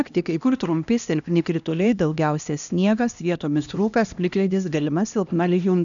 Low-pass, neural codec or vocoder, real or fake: 7.2 kHz; codec, 16 kHz, 1 kbps, X-Codec, HuBERT features, trained on LibriSpeech; fake